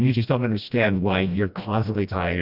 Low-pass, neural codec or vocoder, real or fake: 5.4 kHz; codec, 16 kHz, 1 kbps, FreqCodec, smaller model; fake